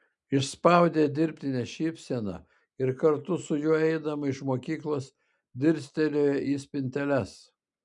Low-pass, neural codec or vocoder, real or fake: 10.8 kHz; none; real